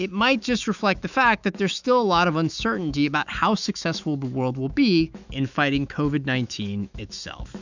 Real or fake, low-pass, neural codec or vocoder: fake; 7.2 kHz; autoencoder, 48 kHz, 128 numbers a frame, DAC-VAE, trained on Japanese speech